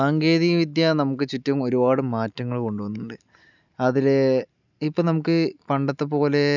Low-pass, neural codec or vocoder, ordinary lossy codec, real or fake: 7.2 kHz; none; none; real